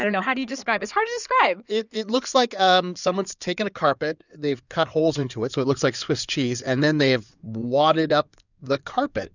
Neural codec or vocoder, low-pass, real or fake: codec, 16 kHz in and 24 kHz out, 2.2 kbps, FireRedTTS-2 codec; 7.2 kHz; fake